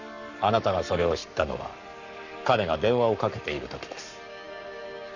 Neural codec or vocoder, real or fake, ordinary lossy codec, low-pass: codec, 44.1 kHz, 7.8 kbps, Pupu-Codec; fake; none; 7.2 kHz